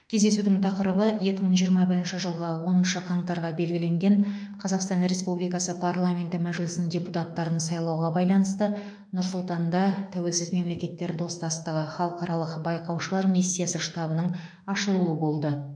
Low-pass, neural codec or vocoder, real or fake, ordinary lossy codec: 9.9 kHz; autoencoder, 48 kHz, 32 numbers a frame, DAC-VAE, trained on Japanese speech; fake; none